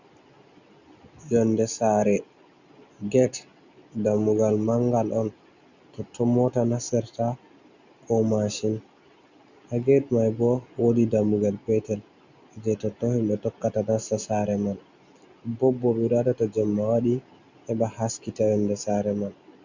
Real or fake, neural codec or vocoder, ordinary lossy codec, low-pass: real; none; Opus, 64 kbps; 7.2 kHz